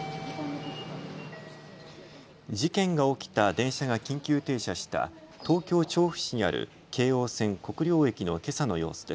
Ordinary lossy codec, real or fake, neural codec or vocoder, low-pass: none; real; none; none